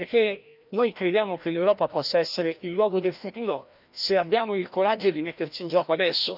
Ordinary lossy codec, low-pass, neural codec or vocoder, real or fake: none; 5.4 kHz; codec, 16 kHz, 1 kbps, FreqCodec, larger model; fake